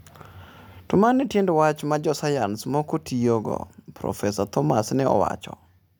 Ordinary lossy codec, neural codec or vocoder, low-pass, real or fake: none; none; none; real